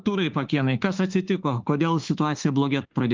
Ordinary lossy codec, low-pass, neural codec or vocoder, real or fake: Opus, 24 kbps; 7.2 kHz; autoencoder, 48 kHz, 32 numbers a frame, DAC-VAE, trained on Japanese speech; fake